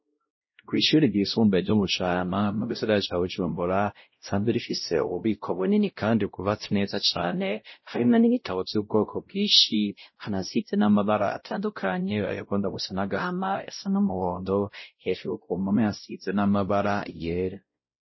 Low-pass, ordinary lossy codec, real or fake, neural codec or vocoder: 7.2 kHz; MP3, 24 kbps; fake; codec, 16 kHz, 0.5 kbps, X-Codec, WavLM features, trained on Multilingual LibriSpeech